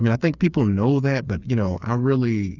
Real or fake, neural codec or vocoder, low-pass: fake; codec, 16 kHz, 4 kbps, FreqCodec, smaller model; 7.2 kHz